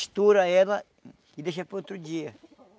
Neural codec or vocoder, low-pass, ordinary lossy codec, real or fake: none; none; none; real